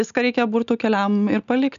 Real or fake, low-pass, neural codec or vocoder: real; 7.2 kHz; none